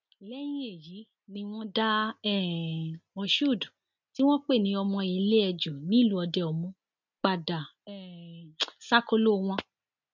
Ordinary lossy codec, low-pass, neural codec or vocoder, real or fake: none; 7.2 kHz; none; real